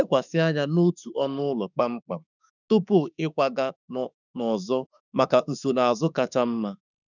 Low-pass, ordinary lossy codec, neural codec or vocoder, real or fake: 7.2 kHz; none; autoencoder, 48 kHz, 32 numbers a frame, DAC-VAE, trained on Japanese speech; fake